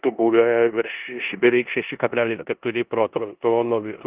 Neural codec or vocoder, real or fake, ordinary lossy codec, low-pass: codec, 16 kHz in and 24 kHz out, 0.9 kbps, LongCat-Audio-Codec, four codebook decoder; fake; Opus, 32 kbps; 3.6 kHz